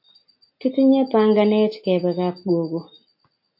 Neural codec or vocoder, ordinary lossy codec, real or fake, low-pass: none; MP3, 32 kbps; real; 5.4 kHz